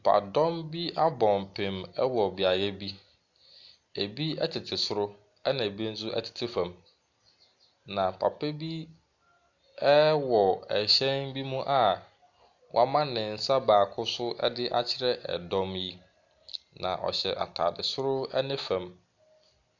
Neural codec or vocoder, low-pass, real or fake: none; 7.2 kHz; real